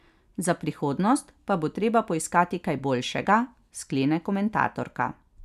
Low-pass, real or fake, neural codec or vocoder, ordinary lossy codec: 14.4 kHz; real; none; none